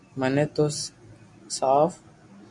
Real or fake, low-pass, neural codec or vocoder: real; 10.8 kHz; none